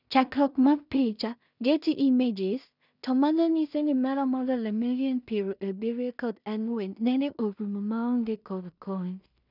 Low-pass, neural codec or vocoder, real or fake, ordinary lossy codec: 5.4 kHz; codec, 16 kHz in and 24 kHz out, 0.4 kbps, LongCat-Audio-Codec, two codebook decoder; fake; none